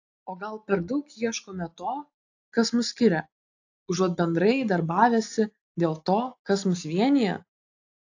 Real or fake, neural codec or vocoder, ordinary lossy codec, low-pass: real; none; AAC, 48 kbps; 7.2 kHz